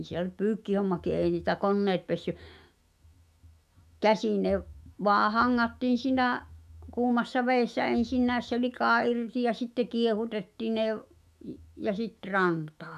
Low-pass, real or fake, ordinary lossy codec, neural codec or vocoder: 14.4 kHz; fake; none; autoencoder, 48 kHz, 128 numbers a frame, DAC-VAE, trained on Japanese speech